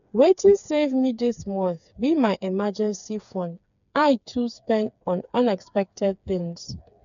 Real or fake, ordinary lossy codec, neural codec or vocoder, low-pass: fake; none; codec, 16 kHz, 4 kbps, FreqCodec, smaller model; 7.2 kHz